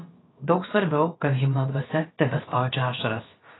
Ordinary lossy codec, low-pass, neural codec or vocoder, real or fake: AAC, 16 kbps; 7.2 kHz; codec, 16 kHz, about 1 kbps, DyCAST, with the encoder's durations; fake